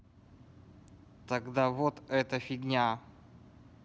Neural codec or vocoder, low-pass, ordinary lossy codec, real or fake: none; none; none; real